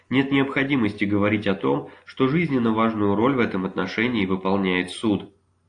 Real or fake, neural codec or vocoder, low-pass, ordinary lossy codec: real; none; 9.9 kHz; AAC, 48 kbps